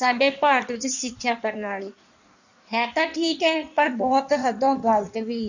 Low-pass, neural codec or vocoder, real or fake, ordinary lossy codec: 7.2 kHz; codec, 16 kHz in and 24 kHz out, 1.1 kbps, FireRedTTS-2 codec; fake; none